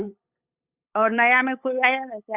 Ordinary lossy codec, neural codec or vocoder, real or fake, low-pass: Opus, 64 kbps; codec, 16 kHz, 8 kbps, FunCodec, trained on LibriTTS, 25 frames a second; fake; 3.6 kHz